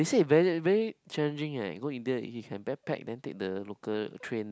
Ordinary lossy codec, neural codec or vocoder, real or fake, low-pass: none; none; real; none